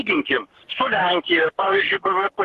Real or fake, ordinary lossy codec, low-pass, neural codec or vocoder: fake; Opus, 16 kbps; 14.4 kHz; codec, 44.1 kHz, 3.4 kbps, Pupu-Codec